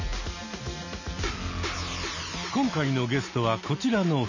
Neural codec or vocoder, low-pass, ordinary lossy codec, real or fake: none; 7.2 kHz; none; real